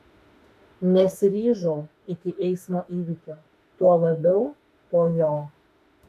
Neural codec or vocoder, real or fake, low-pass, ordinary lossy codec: autoencoder, 48 kHz, 32 numbers a frame, DAC-VAE, trained on Japanese speech; fake; 14.4 kHz; MP3, 64 kbps